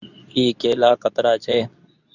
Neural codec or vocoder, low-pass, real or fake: codec, 24 kHz, 0.9 kbps, WavTokenizer, medium speech release version 2; 7.2 kHz; fake